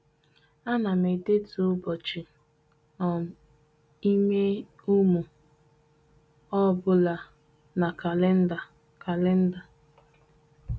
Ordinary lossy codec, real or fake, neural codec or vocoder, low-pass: none; real; none; none